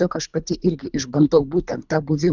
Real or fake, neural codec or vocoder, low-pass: fake; codec, 24 kHz, 3 kbps, HILCodec; 7.2 kHz